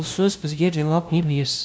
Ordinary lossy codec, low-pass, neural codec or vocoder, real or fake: none; none; codec, 16 kHz, 0.5 kbps, FunCodec, trained on LibriTTS, 25 frames a second; fake